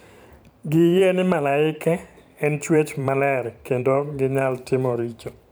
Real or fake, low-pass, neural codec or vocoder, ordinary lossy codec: fake; none; vocoder, 44.1 kHz, 128 mel bands every 512 samples, BigVGAN v2; none